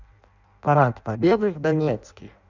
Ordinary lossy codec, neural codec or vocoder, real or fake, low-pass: none; codec, 16 kHz in and 24 kHz out, 0.6 kbps, FireRedTTS-2 codec; fake; 7.2 kHz